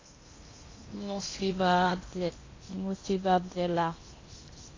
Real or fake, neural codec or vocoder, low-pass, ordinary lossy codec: fake; codec, 16 kHz in and 24 kHz out, 0.8 kbps, FocalCodec, streaming, 65536 codes; 7.2 kHz; AAC, 32 kbps